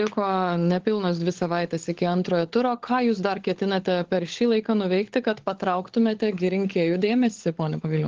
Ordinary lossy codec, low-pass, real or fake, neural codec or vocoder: Opus, 16 kbps; 7.2 kHz; fake; codec, 16 kHz, 16 kbps, FunCodec, trained on LibriTTS, 50 frames a second